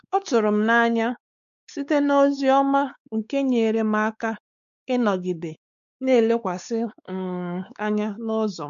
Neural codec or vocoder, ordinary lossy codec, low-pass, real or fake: codec, 16 kHz, 4 kbps, X-Codec, WavLM features, trained on Multilingual LibriSpeech; none; 7.2 kHz; fake